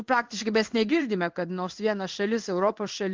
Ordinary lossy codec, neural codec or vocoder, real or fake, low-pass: Opus, 32 kbps; codec, 16 kHz in and 24 kHz out, 1 kbps, XY-Tokenizer; fake; 7.2 kHz